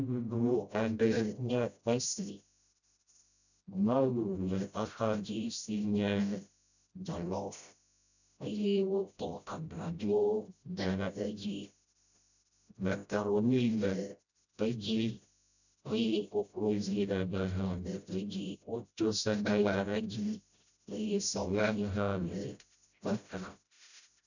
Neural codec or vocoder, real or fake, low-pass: codec, 16 kHz, 0.5 kbps, FreqCodec, smaller model; fake; 7.2 kHz